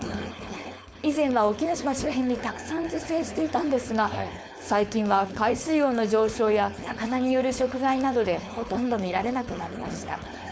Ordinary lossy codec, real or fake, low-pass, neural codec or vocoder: none; fake; none; codec, 16 kHz, 4.8 kbps, FACodec